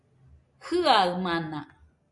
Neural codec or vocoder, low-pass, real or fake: none; 10.8 kHz; real